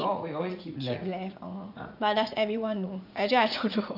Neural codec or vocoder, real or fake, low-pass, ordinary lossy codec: vocoder, 44.1 kHz, 80 mel bands, Vocos; fake; 5.4 kHz; none